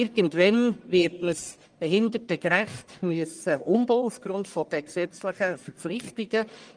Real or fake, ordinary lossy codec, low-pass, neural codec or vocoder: fake; Opus, 32 kbps; 9.9 kHz; codec, 44.1 kHz, 1.7 kbps, Pupu-Codec